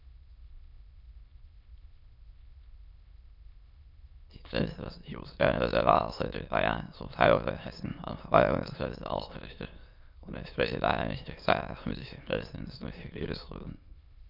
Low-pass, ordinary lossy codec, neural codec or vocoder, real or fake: 5.4 kHz; MP3, 48 kbps; autoencoder, 22.05 kHz, a latent of 192 numbers a frame, VITS, trained on many speakers; fake